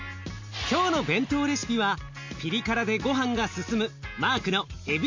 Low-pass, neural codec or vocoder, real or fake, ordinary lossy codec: 7.2 kHz; none; real; MP3, 48 kbps